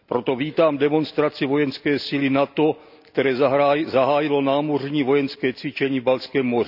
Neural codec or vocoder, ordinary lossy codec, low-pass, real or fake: none; none; 5.4 kHz; real